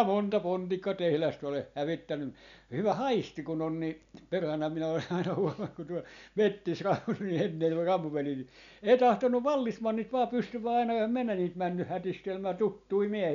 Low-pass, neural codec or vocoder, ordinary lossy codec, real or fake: 7.2 kHz; none; MP3, 96 kbps; real